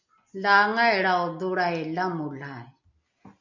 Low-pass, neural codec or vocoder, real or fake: 7.2 kHz; none; real